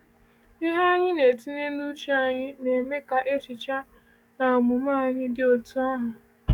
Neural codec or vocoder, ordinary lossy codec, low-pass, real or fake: codec, 44.1 kHz, 7.8 kbps, DAC; none; 19.8 kHz; fake